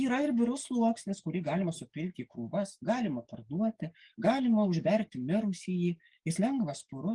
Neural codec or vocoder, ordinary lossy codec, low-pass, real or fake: codec, 44.1 kHz, 7.8 kbps, DAC; Opus, 24 kbps; 10.8 kHz; fake